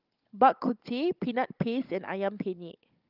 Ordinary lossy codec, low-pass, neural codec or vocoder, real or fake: Opus, 32 kbps; 5.4 kHz; codec, 16 kHz, 16 kbps, FunCodec, trained on Chinese and English, 50 frames a second; fake